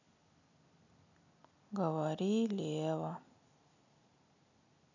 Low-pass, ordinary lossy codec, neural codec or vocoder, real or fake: 7.2 kHz; none; none; real